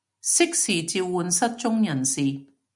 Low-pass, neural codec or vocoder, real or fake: 10.8 kHz; none; real